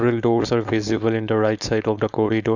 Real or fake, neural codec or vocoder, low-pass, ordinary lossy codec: fake; codec, 16 kHz, 4.8 kbps, FACodec; 7.2 kHz; none